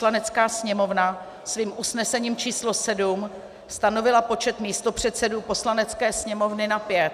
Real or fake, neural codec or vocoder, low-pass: real; none; 14.4 kHz